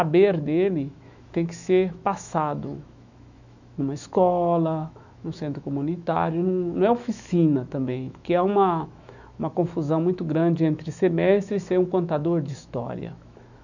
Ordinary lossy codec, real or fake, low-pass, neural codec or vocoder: none; fake; 7.2 kHz; autoencoder, 48 kHz, 128 numbers a frame, DAC-VAE, trained on Japanese speech